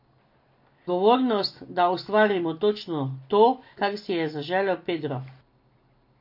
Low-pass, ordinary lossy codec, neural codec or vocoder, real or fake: 5.4 kHz; MP3, 32 kbps; none; real